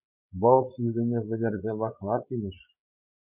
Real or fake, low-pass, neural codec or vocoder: fake; 3.6 kHz; codec, 16 kHz, 8 kbps, FreqCodec, larger model